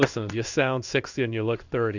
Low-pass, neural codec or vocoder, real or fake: 7.2 kHz; codec, 16 kHz in and 24 kHz out, 1 kbps, XY-Tokenizer; fake